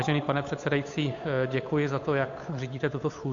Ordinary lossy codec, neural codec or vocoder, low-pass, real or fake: AAC, 48 kbps; codec, 16 kHz, 8 kbps, FunCodec, trained on Chinese and English, 25 frames a second; 7.2 kHz; fake